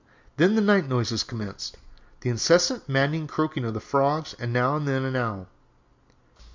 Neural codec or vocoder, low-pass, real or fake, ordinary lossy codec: none; 7.2 kHz; real; MP3, 64 kbps